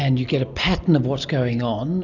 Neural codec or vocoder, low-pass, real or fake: none; 7.2 kHz; real